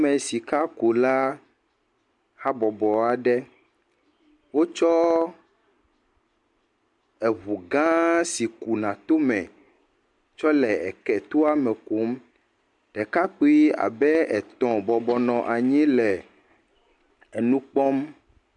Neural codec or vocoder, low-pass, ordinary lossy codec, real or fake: none; 10.8 kHz; MP3, 64 kbps; real